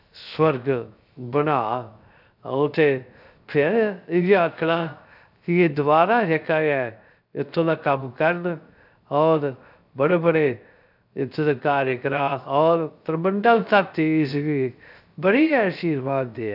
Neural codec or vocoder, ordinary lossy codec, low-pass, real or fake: codec, 16 kHz, 0.3 kbps, FocalCodec; none; 5.4 kHz; fake